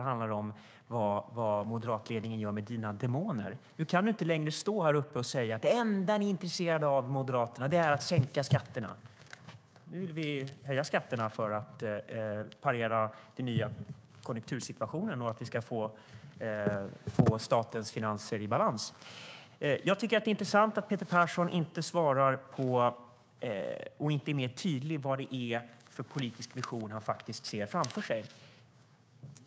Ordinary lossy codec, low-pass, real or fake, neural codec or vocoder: none; none; fake; codec, 16 kHz, 6 kbps, DAC